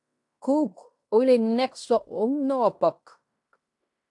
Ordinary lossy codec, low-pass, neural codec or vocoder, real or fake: AAC, 64 kbps; 10.8 kHz; codec, 16 kHz in and 24 kHz out, 0.9 kbps, LongCat-Audio-Codec, fine tuned four codebook decoder; fake